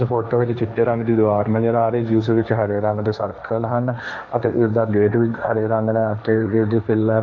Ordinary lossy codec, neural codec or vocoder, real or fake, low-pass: none; codec, 16 kHz, 1.1 kbps, Voila-Tokenizer; fake; none